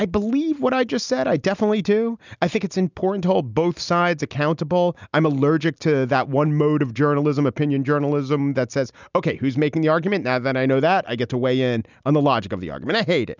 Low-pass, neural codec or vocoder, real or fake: 7.2 kHz; none; real